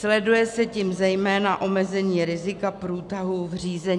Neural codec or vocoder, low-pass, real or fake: none; 10.8 kHz; real